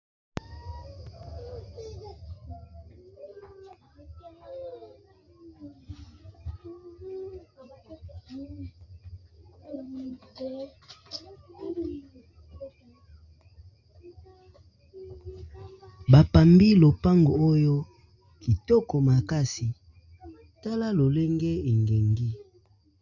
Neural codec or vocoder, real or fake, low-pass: none; real; 7.2 kHz